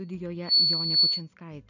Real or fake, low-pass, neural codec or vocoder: real; 7.2 kHz; none